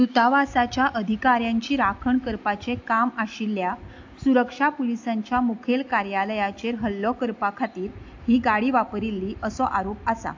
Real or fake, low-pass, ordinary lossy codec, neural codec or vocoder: real; 7.2 kHz; AAC, 48 kbps; none